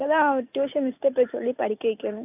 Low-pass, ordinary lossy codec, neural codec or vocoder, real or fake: 3.6 kHz; AAC, 32 kbps; none; real